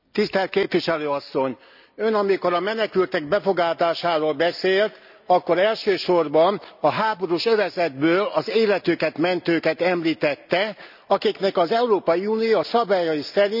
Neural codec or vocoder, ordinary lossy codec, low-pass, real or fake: none; none; 5.4 kHz; real